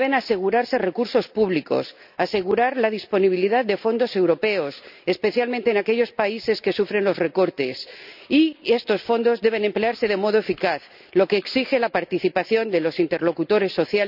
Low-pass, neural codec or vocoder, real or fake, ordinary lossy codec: 5.4 kHz; none; real; none